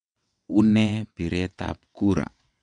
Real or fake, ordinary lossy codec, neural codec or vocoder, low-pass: fake; none; vocoder, 22.05 kHz, 80 mel bands, WaveNeXt; 9.9 kHz